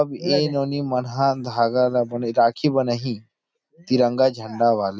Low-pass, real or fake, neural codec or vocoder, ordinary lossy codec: none; real; none; none